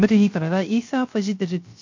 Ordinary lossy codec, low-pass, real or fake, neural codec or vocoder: MP3, 48 kbps; 7.2 kHz; fake; codec, 16 kHz, 0.3 kbps, FocalCodec